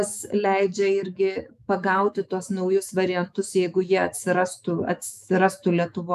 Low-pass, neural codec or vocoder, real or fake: 14.4 kHz; autoencoder, 48 kHz, 128 numbers a frame, DAC-VAE, trained on Japanese speech; fake